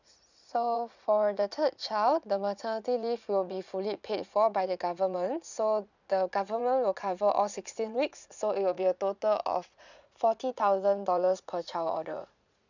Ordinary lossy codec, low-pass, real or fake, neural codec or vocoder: none; 7.2 kHz; fake; vocoder, 44.1 kHz, 80 mel bands, Vocos